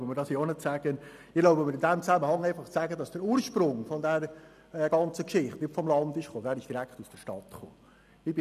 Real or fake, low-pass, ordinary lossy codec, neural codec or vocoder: real; 14.4 kHz; none; none